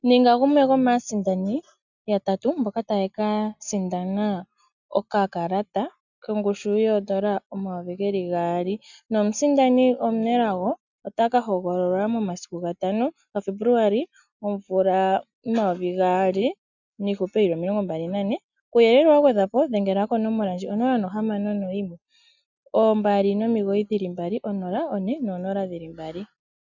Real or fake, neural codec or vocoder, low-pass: real; none; 7.2 kHz